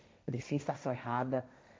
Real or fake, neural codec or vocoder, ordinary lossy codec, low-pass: fake; codec, 16 kHz, 1.1 kbps, Voila-Tokenizer; none; none